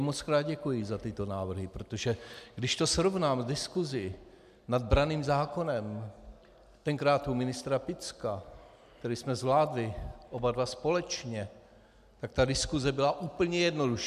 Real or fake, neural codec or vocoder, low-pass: real; none; 14.4 kHz